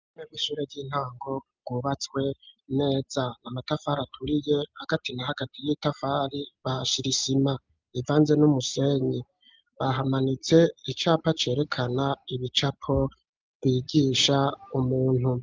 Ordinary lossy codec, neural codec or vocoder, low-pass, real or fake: Opus, 24 kbps; none; 7.2 kHz; real